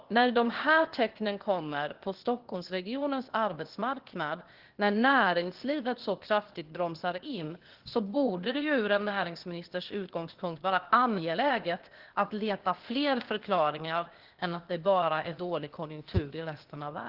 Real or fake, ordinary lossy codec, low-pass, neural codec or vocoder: fake; Opus, 16 kbps; 5.4 kHz; codec, 16 kHz, 0.8 kbps, ZipCodec